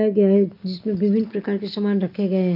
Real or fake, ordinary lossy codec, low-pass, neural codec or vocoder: real; none; 5.4 kHz; none